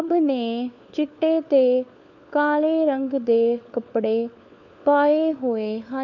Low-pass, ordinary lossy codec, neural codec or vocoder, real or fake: 7.2 kHz; none; codec, 16 kHz, 4 kbps, FunCodec, trained on LibriTTS, 50 frames a second; fake